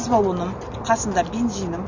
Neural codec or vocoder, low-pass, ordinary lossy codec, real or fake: none; 7.2 kHz; none; real